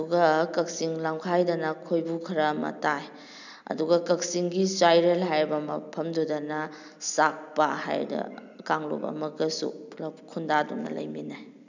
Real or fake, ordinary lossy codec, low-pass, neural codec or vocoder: real; none; 7.2 kHz; none